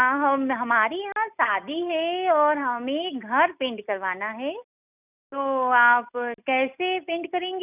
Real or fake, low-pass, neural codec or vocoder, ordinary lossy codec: real; 3.6 kHz; none; none